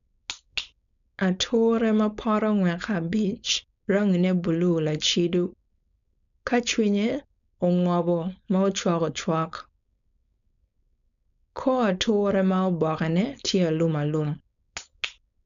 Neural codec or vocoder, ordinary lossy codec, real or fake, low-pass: codec, 16 kHz, 4.8 kbps, FACodec; none; fake; 7.2 kHz